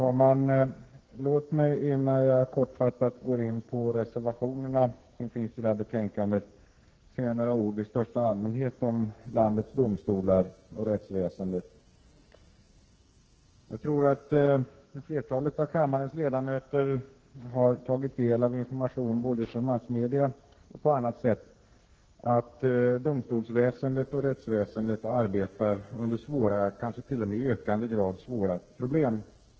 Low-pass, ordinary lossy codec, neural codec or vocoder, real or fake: 7.2 kHz; Opus, 16 kbps; codec, 44.1 kHz, 2.6 kbps, SNAC; fake